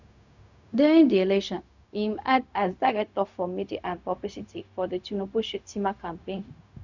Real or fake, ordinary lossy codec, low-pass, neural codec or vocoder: fake; none; 7.2 kHz; codec, 16 kHz, 0.4 kbps, LongCat-Audio-Codec